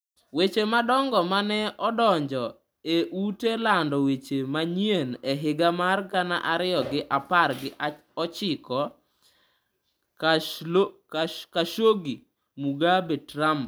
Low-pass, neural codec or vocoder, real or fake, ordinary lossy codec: none; none; real; none